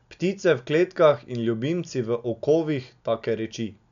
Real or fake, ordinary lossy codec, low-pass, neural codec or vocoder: real; none; 7.2 kHz; none